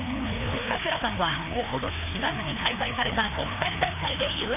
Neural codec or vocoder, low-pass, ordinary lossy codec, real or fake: codec, 16 kHz, 2 kbps, FreqCodec, larger model; 3.6 kHz; none; fake